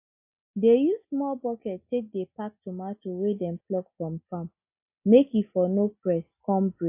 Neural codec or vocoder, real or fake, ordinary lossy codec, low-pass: none; real; AAC, 32 kbps; 3.6 kHz